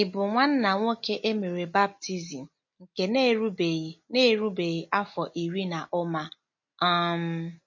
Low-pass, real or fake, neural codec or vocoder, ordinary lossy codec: 7.2 kHz; real; none; MP3, 32 kbps